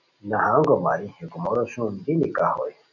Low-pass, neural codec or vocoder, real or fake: 7.2 kHz; none; real